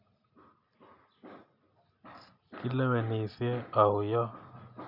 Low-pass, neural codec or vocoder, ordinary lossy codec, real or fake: 5.4 kHz; none; none; real